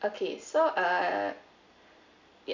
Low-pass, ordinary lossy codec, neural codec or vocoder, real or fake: 7.2 kHz; none; none; real